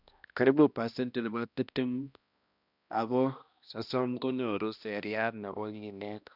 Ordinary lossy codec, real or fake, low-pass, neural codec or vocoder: none; fake; 5.4 kHz; codec, 16 kHz, 1 kbps, X-Codec, HuBERT features, trained on balanced general audio